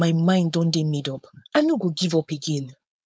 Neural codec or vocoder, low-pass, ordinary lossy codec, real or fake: codec, 16 kHz, 4.8 kbps, FACodec; none; none; fake